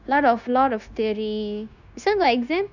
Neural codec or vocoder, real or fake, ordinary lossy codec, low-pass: codec, 16 kHz, 0.9 kbps, LongCat-Audio-Codec; fake; none; 7.2 kHz